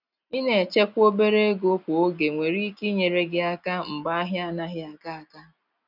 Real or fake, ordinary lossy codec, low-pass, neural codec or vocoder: real; none; 5.4 kHz; none